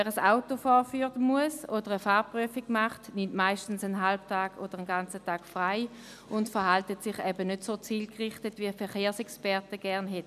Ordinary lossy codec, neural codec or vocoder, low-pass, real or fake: none; none; 14.4 kHz; real